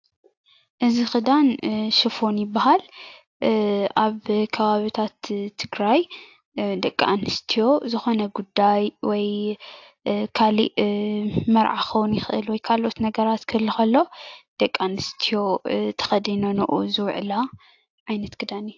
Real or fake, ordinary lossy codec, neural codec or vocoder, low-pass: real; AAC, 48 kbps; none; 7.2 kHz